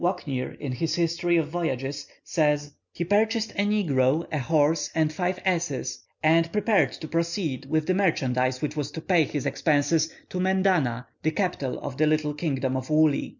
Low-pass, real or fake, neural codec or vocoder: 7.2 kHz; real; none